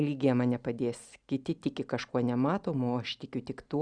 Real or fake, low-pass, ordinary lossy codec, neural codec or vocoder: real; 9.9 kHz; Opus, 64 kbps; none